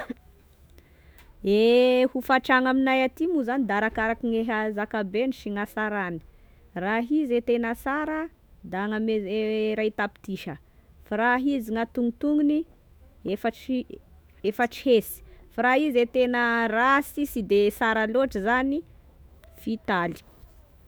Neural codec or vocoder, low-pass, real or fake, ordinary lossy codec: autoencoder, 48 kHz, 128 numbers a frame, DAC-VAE, trained on Japanese speech; none; fake; none